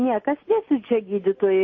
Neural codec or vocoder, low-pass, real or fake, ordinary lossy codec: none; 7.2 kHz; real; MP3, 32 kbps